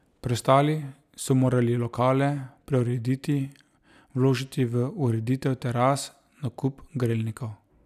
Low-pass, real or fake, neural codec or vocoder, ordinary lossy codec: 14.4 kHz; real; none; none